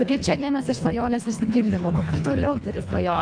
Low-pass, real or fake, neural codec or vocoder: 9.9 kHz; fake; codec, 24 kHz, 1.5 kbps, HILCodec